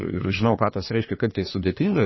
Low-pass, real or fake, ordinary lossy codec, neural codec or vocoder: 7.2 kHz; fake; MP3, 24 kbps; codec, 44.1 kHz, 3.4 kbps, Pupu-Codec